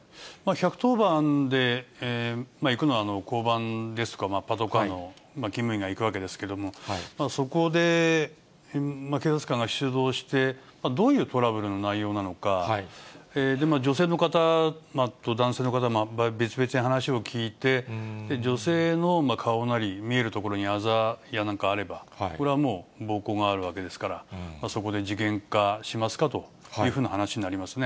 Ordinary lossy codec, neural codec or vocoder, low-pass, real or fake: none; none; none; real